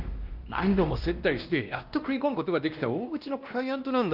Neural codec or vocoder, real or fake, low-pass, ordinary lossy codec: codec, 16 kHz, 1 kbps, X-Codec, WavLM features, trained on Multilingual LibriSpeech; fake; 5.4 kHz; Opus, 24 kbps